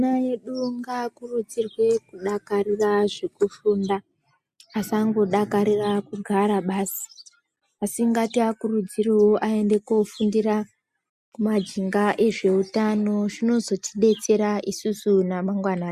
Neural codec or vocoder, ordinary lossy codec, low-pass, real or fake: none; MP3, 96 kbps; 14.4 kHz; real